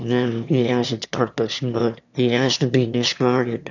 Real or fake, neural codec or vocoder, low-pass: fake; autoencoder, 22.05 kHz, a latent of 192 numbers a frame, VITS, trained on one speaker; 7.2 kHz